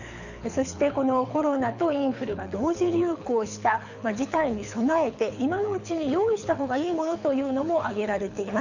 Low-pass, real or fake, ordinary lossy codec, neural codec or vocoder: 7.2 kHz; fake; none; codec, 24 kHz, 6 kbps, HILCodec